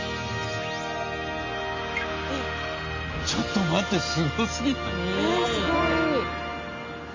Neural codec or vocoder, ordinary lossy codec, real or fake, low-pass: none; MP3, 32 kbps; real; 7.2 kHz